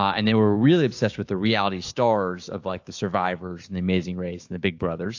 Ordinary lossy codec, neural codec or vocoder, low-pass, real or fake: AAC, 48 kbps; codec, 16 kHz, 6 kbps, DAC; 7.2 kHz; fake